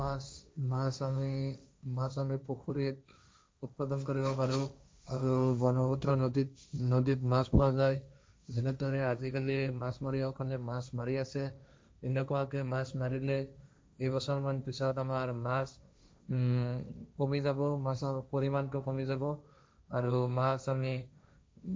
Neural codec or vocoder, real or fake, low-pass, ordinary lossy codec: codec, 16 kHz, 1.1 kbps, Voila-Tokenizer; fake; none; none